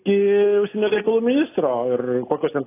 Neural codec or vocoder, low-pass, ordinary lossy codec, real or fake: none; 3.6 kHz; AAC, 24 kbps; real